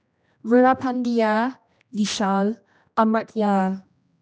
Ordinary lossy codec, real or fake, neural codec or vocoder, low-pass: none; fake; codec, 16 kHz, 1 kbps, X-Codec, HuBERT features, trained on general audio; none